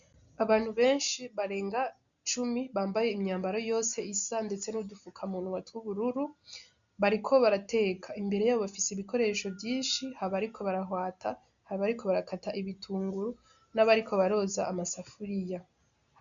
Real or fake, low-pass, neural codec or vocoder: real; 7.2 kHz; none